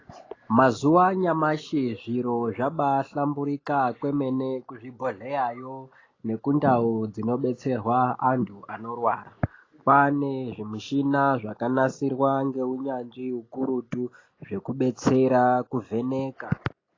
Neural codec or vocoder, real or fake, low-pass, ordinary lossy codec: none; real; 7.2 kHz; AAC, 32 kbps